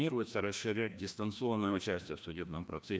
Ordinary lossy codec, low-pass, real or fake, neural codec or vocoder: none; none; fake; codec, 16 kHz, 1 kbps, FreqCodec, larger model